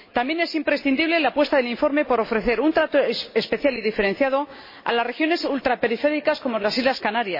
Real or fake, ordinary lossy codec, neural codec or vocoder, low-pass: real; MP3, 24 kbps; none; 5.4 kHz